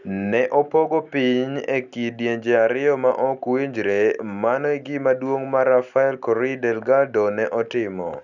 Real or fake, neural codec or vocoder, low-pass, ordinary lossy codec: real; none; 7.2 kHz; none